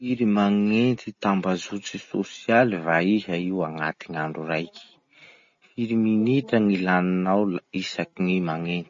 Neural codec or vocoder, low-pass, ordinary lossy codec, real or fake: none; 7.2 kHz; MP3, 32 kbps; real